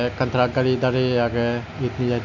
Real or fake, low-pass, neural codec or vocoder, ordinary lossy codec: real; 7.2 kHz; none; none